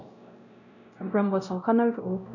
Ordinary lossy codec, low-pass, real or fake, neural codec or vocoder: none; 7.2 kHz; fake; codec, 16 kHz, 0.5 kbps, X-Codec, WavLM features, trained on Multilingual LibriSpeech